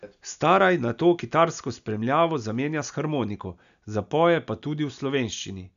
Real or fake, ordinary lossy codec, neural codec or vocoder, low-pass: real; none; none; 7.2 kHz